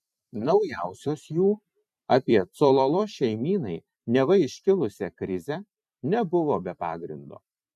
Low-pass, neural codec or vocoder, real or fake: 14.4 kHz; vocoder, 44.1 kHz, 128 mel bands every 256 samples, BigVGAN v2; fake